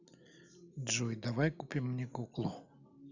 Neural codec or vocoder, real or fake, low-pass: none; real; 7.2 kHz